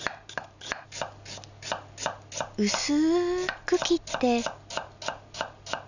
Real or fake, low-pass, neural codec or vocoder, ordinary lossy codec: real; 7.2 kHz; none; none